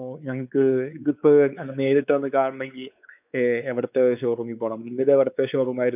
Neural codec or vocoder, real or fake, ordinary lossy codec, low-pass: codec, 16 kHz, 4 kbps, X-Codec, WavLM features, trained on Multilingual LibriSpeech; fake; none; 3.6 kHz